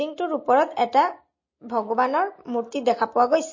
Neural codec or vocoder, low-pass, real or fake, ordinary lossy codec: none; 7.2 kHz; real; MP3, 32 kbps